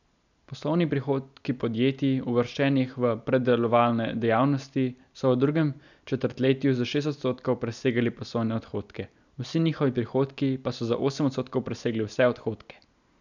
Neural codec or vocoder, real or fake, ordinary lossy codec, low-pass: none; real; none; 7.2 kHz